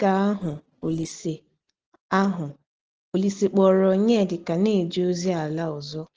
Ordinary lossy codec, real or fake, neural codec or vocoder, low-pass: Opus, 16 kbps; real; none; 7.2 kHz